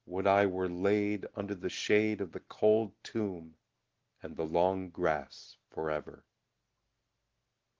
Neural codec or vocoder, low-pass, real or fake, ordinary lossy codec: none; 7.2 kHz; real; Opus, 16 kbps